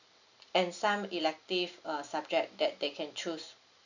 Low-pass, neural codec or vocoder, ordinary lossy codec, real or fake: 7.2 kHz; none; none; real